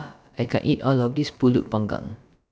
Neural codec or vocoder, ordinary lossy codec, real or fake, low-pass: codec, 16 kHz, about 1 kbps, DyCAST, with the encoder's durations; none; fake; none